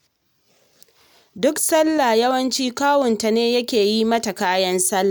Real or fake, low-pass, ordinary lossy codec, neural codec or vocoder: real; none; none; none